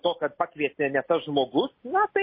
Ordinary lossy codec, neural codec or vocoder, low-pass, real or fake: MP3, 24 kbps; none; 5.4 kHz; real